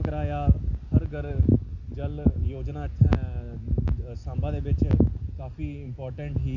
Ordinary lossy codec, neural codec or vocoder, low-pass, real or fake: none; none; 7.2 kHz; real